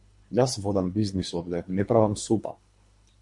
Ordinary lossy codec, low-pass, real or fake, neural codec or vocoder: MP3, 48 kbps; 10.8 kHz; fake; codec, 24 kHz, 3 kbps, HILCodec